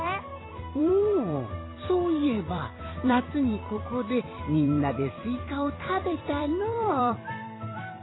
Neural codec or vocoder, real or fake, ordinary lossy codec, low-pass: vocoder, 44.1 kHz, 128 mel bands every 256 samples, BigVGAN v2; fake; AAC, 16 kbps; 7.2 kHz